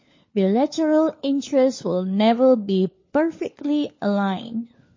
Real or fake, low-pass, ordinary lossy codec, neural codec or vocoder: fake; 7.2 kHz; MP3, 32 kbps; codec, 16 kHz, 4 kbps, FunCodec, trained on LibriTTS, 50 frames a second